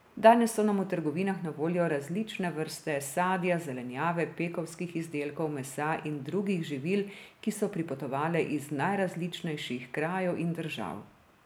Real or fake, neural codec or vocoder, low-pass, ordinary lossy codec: real; none; none; none